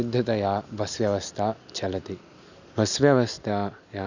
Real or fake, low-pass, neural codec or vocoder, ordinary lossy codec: real; 7.2 kHz; none; none